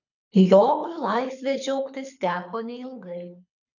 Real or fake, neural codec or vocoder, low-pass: fake; codec, 24 kHz, 6 kbps, HILCodec; 7.2 kHz